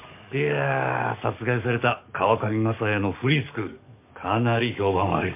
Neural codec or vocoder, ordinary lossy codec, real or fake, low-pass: codec, 44.1 kHz, 7.8 kbps, DAC; MP3, 32 kbps; fake; 3.6 kHz